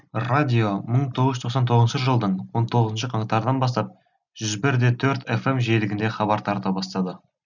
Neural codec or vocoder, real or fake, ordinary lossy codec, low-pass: none; real; none; 7.2 kHz